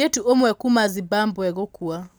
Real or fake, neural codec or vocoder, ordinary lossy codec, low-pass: real; none; none; none